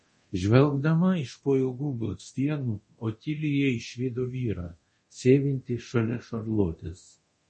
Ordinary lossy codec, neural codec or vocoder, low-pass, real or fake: MP3, 32 kbps; codec, 24 kHz, 0.9 kbps, DualCodec; 10.8 kHz; fake